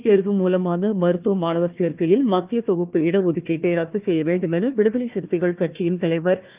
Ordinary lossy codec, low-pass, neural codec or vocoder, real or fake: Opus, 24 kbps; 3.6 kHz; codec, 16 kHz, 1 kbps, FunCodec, trained on Chinese and English, 50 frames a second; fake